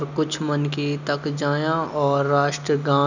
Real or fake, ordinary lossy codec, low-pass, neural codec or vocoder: real; none; 7.2 kHz; none